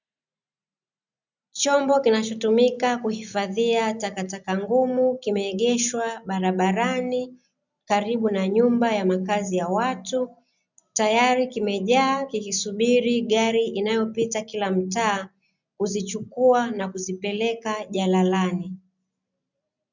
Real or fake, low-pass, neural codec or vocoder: real; 7.2 kHz; none